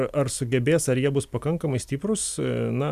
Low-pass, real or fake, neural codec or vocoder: 14.4 kHz; real; none